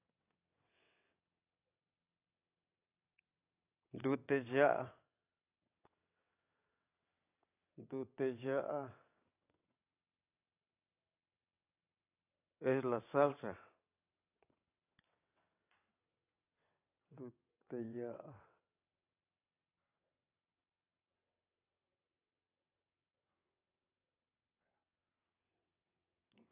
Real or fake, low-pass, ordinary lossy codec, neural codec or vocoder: fake; 3.6 kHz; AAC, 24 kbps; vocoder, 22.05 kHz, 80 mel bands, WaveNeXt